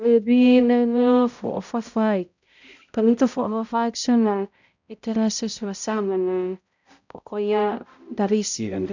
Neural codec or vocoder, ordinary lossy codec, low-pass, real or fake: codec, 16 kHz, 0.5 kbps, X-Codec, HuBERT features, trained on balanced general audio; none; 7.2 kHz; fake